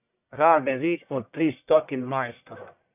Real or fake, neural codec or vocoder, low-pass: fake; codec, 44.1 kHz, 1.7 kbps, Pupu-Codec; 3.6 kHz